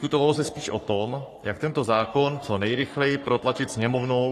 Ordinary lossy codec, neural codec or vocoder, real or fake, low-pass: AAC, 48 kbps; codec, 44.1 kHz, 3.4 kbps, Pupu-Codec; fake; 14.4 kHz